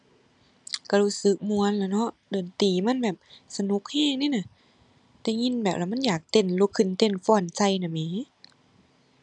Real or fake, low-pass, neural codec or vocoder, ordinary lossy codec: real; 10.8 kHz; none; none